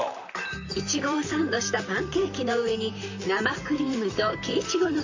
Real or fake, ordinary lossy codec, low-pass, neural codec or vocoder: fake; AAC, 48 kbps; 7.2 kHz; vocoder, 44.1 kHz, 128 mel bands, Pupu-Vocoder